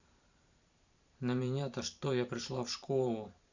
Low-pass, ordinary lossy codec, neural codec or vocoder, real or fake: 7.2 kHz; Opus, 64 kbps; vocoder, 44.1 kHz, 128 mel bands every 512 samples, BigVGAN v2; fake